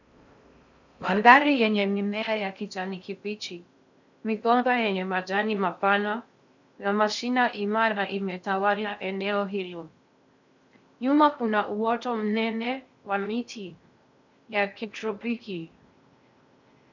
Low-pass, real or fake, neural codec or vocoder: 7.2 kHz; fake; codec, 16 kHz in and 24 kHz out, 0.6 kbps, FocalCodec, streaming, 4096 codes